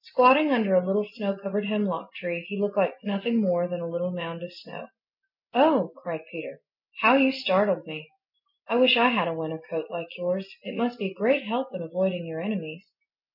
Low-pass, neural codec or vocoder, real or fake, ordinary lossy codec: 5.4 kHz; none; real; MP3, 48 kbps